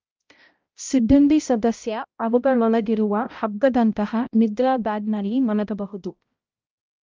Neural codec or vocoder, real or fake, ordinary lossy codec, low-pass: codec, 16 kHz, 0.5 kbps, X-Codec, HuBERT features, trained on balanced general audio; fake; Opus, 24 kbps; 7.2 kHz